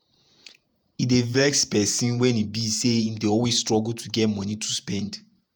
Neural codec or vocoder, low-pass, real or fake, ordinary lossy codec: vocoder, 48 kHz, 128 mel bands, Vocos; none; fake; none